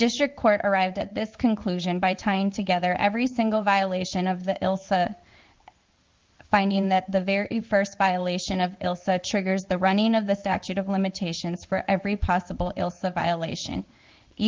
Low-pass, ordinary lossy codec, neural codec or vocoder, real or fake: 7.2 kHz; Opus, 32 kbps; codec, 16 kHz in and 24 kHz out, 1 kbps, XY-Tokenizer; fake